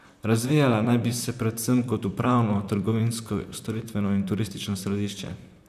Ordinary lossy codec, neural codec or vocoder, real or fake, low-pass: none; vocoder, 44.1 kHz, 128 mel bands, Pupu-Vocoder; fake; 14.4 kHz